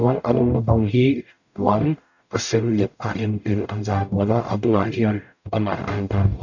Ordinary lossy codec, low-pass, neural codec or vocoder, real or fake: none; 7.2 kHz; codec, 44.1 kHz, 0.9 kbps, DAC; fake